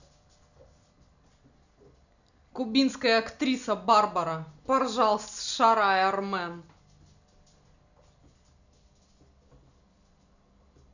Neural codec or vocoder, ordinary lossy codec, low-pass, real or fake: none; none; 7.2 kHz; real